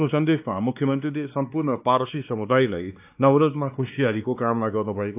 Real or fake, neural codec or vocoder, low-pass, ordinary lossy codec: fake; codec, 16 kHz, 2 kbps, X-Codec, WavLM features, trained on Multilingual LibriSpeech; 3.6 kHz; AAC, 32 kbps